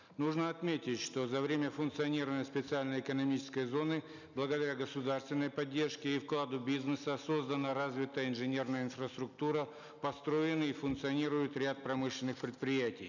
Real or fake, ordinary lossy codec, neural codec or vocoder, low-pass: real; none; none; 7.2 kHz